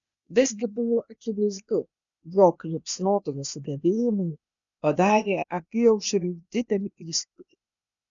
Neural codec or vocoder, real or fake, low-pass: codec, 16 kHz, 0.8 kbps, ZipCodec; fake; 7.2 kHz